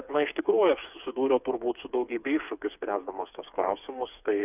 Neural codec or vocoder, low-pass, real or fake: codec, 16 kHz, 4 kbps, FreqCodec, smaller model; 3.6 kHz; fake